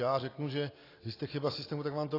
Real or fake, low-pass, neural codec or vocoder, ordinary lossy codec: real; 5.4 kHz; none; AAC, 24 kbps